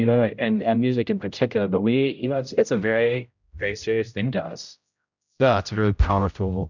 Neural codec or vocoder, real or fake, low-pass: codec, 16 kHz, 0.5 kbps, X-Codec, HuBERT features, trained on general audio; fake; 7.2 kHz